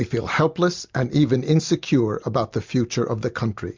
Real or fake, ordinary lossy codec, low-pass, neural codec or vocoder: real; MP3, 64 kbps; 7.2 kHz; none